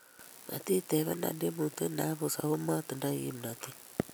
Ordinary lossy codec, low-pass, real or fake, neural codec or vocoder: none; none; real; none